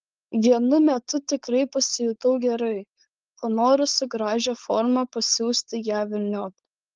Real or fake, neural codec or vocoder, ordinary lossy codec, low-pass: fake; codec, 16 kHz, 4.8 kbps, FACodec; Opus, 24 kbps; 7.2 kHz